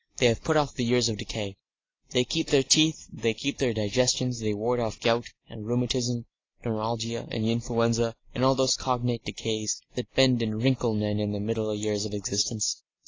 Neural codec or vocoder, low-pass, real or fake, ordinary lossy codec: none; 7.2 kHz; real; AAC, 32 kbps